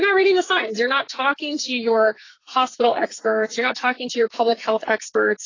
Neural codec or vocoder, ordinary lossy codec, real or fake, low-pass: codec, 44.1 kHz, 2.6 kbps, SNAC; AAC, 32 kbps; fake; 7.2 kHz